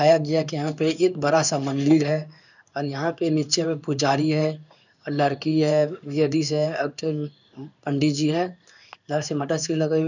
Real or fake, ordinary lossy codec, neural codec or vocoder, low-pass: fake; none; codec, 16 kHz in and 24 kHz out, 1 kbps, XY-Tokenizer; 7.2 kHz